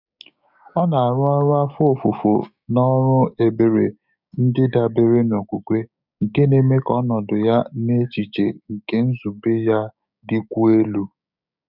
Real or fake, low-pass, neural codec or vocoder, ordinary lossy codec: fake; 5.4 kHz; codec, 16 kHz, 6 kbps, DAC; none